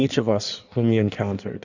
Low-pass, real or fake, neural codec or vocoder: 7.2 kHz; fake; codec, 16 kHz in and 24 kHz out, 1.1 kbps, FireRedTTS-2 codec